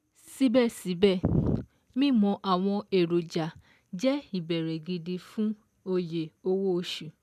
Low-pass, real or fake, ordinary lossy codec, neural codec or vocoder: 14.4 kHz; real; none; none